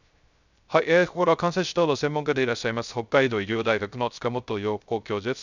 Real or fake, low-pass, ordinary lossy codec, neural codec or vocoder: fake; 7.2 kHz; none; codec, 16 kHz, 0.3 kbps, FocalCodec